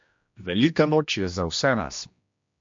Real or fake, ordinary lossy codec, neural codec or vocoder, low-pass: fake; MP3, 48 kbps; codec, 16 kHz, 1 kbps, X-Codec, HuBERT features, trained on general audio; 7.2 kHz